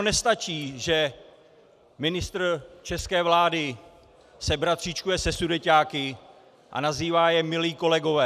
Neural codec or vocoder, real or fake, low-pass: none; real; 14.4 kHz